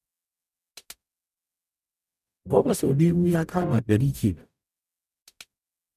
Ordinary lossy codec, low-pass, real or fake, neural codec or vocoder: none; 14.4 kHz; fake; codec, 44.1 kHz, 0.9 kbps, DAC